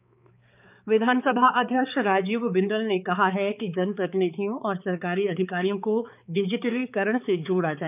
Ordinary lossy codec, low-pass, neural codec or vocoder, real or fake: none; 3.6 kHz; codec, 16 kHz, 4 kbps, X-Codec, HuBERT features, trained on balanced general audio; fake